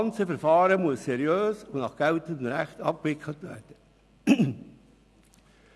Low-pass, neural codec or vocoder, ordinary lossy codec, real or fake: none; none; none; real